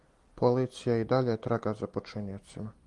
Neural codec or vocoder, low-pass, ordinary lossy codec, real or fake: none; 10.8 kHz; Opus, 24 kbps; real